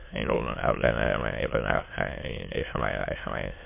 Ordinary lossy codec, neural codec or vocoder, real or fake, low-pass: MP3, 24 kbps; autoencoder, 22.05 kHz, a latent of 192 numbers a frame, VITS, trained on many speakers; fake; 3.6 kHz